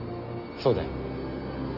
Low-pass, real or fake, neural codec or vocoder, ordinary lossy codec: 5.4 kHz; real; none; AAC, 48 kbps